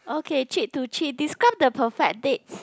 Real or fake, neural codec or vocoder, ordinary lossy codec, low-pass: real; none; none; none